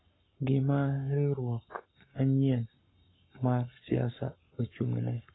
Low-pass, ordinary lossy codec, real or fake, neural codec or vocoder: 7.2 kHz; AAC, 16 kbps; fake; codec, 44.1 kHz, 7.8 kbps, Pupu-Codec